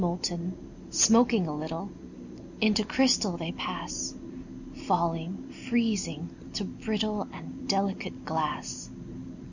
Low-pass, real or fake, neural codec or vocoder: 7.2 kHz; real; none